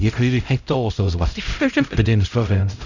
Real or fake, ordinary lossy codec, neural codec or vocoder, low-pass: fake; none; codec, 16 kHz, 0.5 kbps, X-Codec, HuBERT features, trained on LibriSpeech; 7.2 kHz